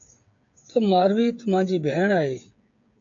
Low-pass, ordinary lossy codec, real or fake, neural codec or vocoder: 7.2 kHz; AAC, 48 kbps; fake; codec, 16 kHz, 8 kbps, FreqCodec, smaller model